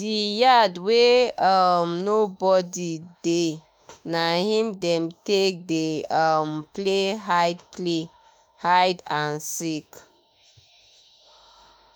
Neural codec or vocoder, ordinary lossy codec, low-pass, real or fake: autoencoder, 48 kHz, 32 numbers a frame, DAC-VAE, trained on Japanese speech; none; none; fake